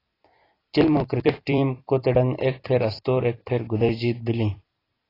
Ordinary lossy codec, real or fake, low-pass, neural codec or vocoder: AAC, 24 kbps; fake; 5.4 kHz; vocoder, 44.1 kHz, 128 mel bands every 256 samples, BigVGAN v2